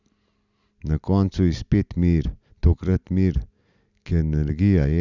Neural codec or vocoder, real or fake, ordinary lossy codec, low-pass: none; real; none; 7.2 kHz